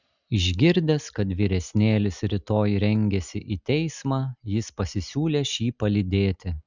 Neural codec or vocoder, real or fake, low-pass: none; real; 7.2 kHz